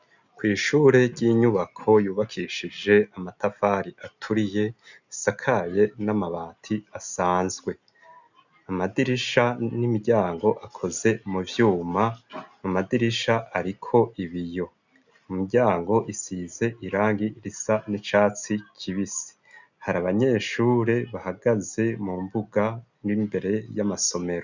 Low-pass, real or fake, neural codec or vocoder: 7.2 kHz; real; none